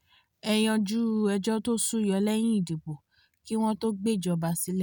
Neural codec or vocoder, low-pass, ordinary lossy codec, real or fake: none; none; none; real